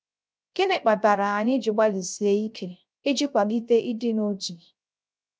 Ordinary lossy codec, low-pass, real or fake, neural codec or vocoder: none; none; fake; codec, 16 kHz, 0.3 kbps, FocalCodec